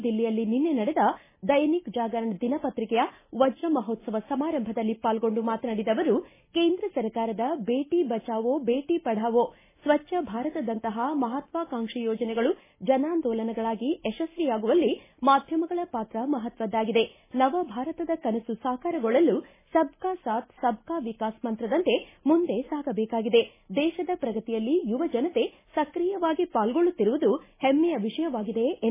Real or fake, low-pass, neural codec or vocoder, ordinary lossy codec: real; 3.6 kHz; none; MP3, 16 kbps